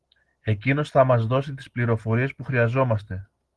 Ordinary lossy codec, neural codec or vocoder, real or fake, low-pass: Opus, 16 kbps; none; real; 10.8 kHz